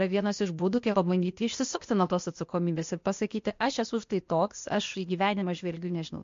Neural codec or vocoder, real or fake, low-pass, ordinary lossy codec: codec, 16 kHz, 0.8 kbps, ZipCodec; fake; 7.2 kHz; MP3, 48 kbps